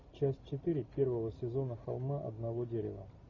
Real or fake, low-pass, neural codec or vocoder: real; 7.2 kHz; none